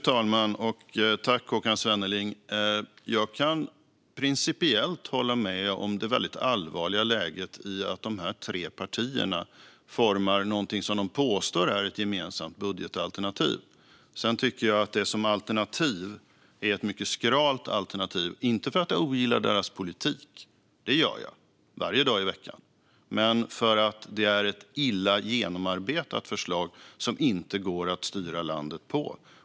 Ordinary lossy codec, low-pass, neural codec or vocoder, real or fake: none; none; none; real